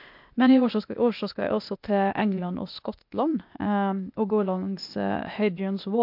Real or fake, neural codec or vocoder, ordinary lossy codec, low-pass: fake; codec, 16 kHz, 0.8 kbps, ZipCodec; MP3, 48 kbps; 5.4 kHz